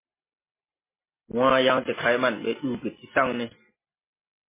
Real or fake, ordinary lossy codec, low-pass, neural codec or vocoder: real; MP3, 16 kbps; 3.6 kHz; none